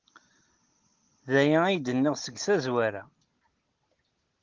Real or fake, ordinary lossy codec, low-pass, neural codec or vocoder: real; Opus, 16 kbps; 7.2 kHz; none